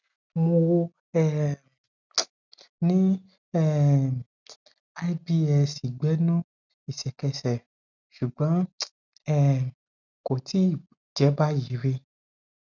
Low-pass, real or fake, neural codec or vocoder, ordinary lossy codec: 7.2 kHz; real; none; none